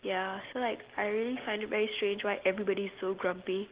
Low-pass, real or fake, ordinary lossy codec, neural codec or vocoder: 3.6 kHz; real; Opus, 16 kbps; none